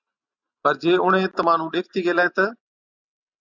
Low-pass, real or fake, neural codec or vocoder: 7.2 kHz; real; none